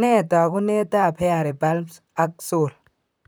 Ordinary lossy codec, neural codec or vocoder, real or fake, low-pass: none; vocoder, 44.1 kHz, 128 mel bands, Pupu-Vocoder; fake; none